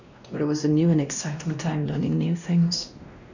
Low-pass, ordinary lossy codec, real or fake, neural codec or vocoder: 7.2 kHz; none; fake; codec, 16 kHz, 1 kbps, X-Codec, WavLM features, trained on Multilingual LibriSpeech